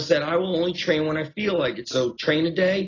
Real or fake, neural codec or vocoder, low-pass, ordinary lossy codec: real; none; 7.2 kHz; AAC, 32 kbps